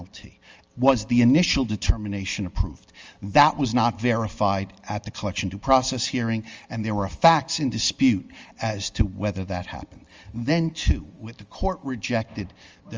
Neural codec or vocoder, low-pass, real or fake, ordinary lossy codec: none; 7.2 kHz; real; Opus, 32 kbps